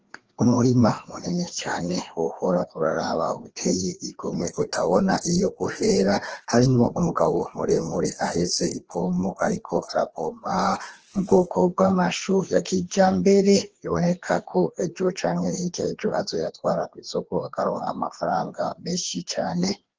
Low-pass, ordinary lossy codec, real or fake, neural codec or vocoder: 7.2 kHz; Opus, 16 kbps; fake; codec, 16 kHz, 2 kbps, FreqCodec, larger model